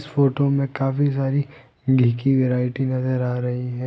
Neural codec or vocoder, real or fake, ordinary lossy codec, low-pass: none; real; none; none